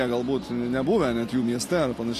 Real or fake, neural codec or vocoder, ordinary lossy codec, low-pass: real; none; AAC, 48 kbps; 14.4 kHz